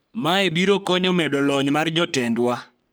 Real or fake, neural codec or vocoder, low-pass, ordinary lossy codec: fake; codec, 44.1 kHz, 3.4 kbps, Pupu-Codec; none; none